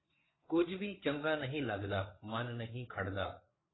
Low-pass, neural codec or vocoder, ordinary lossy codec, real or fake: 7.2 kHz; codec, 16 kHz, 4 kbps, FreqCodec, larger model; AAC, 16 kbps; fake